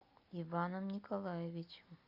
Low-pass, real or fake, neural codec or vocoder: 5.4 kHz; real; none